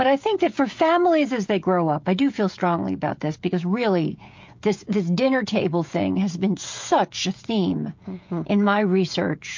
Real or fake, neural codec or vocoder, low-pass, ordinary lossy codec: fake; codec, 16 kHz, 16 kbps, FreqCodec, smaller model; 7.2 kHz; MP3, 48 kbps